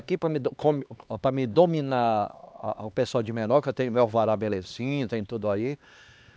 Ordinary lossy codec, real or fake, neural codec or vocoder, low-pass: none; fake; codec, 16 kHz, 2 kbps, X-Codec, HuBERT features, trained on LibriSpeech; none